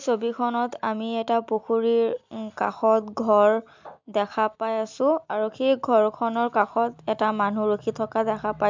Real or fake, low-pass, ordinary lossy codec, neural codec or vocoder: real; 7.2 kHz; MP3, 64 kbps; none